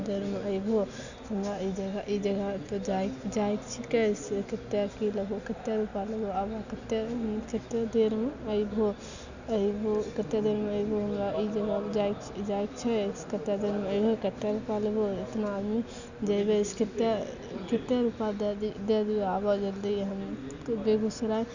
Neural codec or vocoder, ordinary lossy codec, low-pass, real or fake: none; none; 7.2 kHz; real